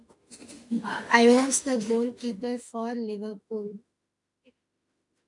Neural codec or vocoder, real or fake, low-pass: autoencoder, 48 kHz, 32 numbers a frame, DAC-VAE, trained on Japanese speech; fake; 10.8 kHz